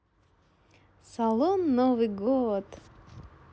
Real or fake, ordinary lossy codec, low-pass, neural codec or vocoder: real; none; none; none